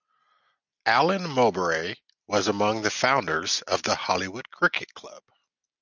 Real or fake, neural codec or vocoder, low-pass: real; none; 7.2 kHz